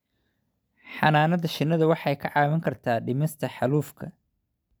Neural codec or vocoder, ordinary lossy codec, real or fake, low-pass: none; none; real; none